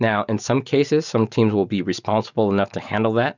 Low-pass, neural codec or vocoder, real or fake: 7.2 kHz; none; real